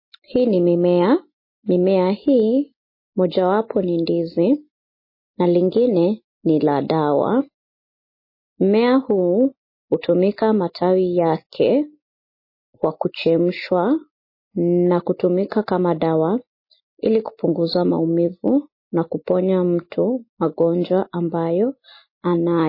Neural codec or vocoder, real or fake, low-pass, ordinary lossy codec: none; real; 5.4 kHz; MP3, 24 kbps